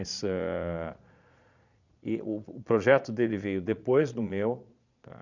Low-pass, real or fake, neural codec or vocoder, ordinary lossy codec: 7.2 kHz; fake; vocoder, 22.05 kHz, 80 mel bands, Vocos; none